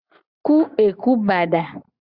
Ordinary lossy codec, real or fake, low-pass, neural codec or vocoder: Opus, 64 kbps; real; 5.4 kHz; none